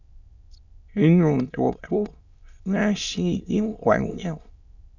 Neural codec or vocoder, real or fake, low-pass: autoencoder, 22.05 kHz, a latent of 192 numbers a frame, VITS, trained on many speakers; fake; 7.2 kHz